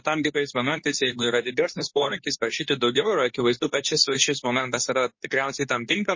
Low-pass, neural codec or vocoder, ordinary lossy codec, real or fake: 7.2 kHz; codec, 24 kHz, 0.9 kbps, WavTokenizer, medium speech release version 2; MP3, 32 kbps; fake